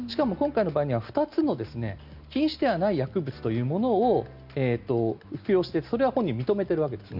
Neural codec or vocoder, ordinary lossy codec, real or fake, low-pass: none; Opus, 64 kbps; real; 5.4 kHz